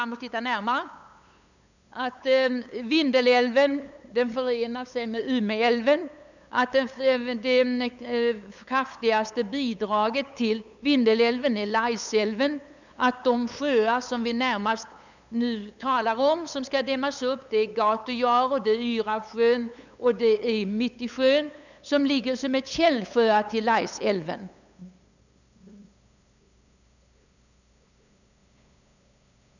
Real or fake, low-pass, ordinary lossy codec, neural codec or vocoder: fake; 7.2 kHz; none; codec, 16 kHz, 8 kbps, FunCodec, trained on LibriTTS, 25 frames a second